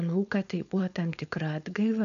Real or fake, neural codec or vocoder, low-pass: fake; codec, 16 kHz, 4.8 kbps, FACodec; 7.2 kHz